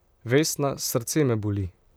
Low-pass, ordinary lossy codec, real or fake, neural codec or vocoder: none; none; fake; vocoder, 44.1 kHz, 128 mel bands, Pupu-Vocoder